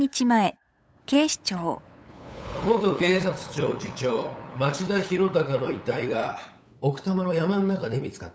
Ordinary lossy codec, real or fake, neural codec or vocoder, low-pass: none; fake; codec, 16 kHz, 8 kbps, FunCodec, trained on LibriTTS, 25 frames a second; none